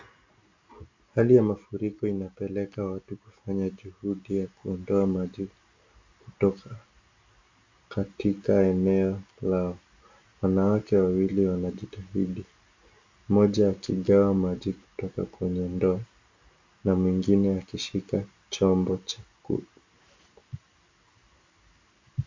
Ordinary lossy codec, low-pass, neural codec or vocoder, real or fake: MP3, 48 kbps; 7.2 kHz; none; real